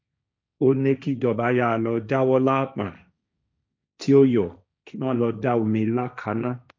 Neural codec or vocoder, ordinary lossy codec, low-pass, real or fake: codec, 16 kHz, 1.1 kbps, Voila-Tokenizer; none; none; fake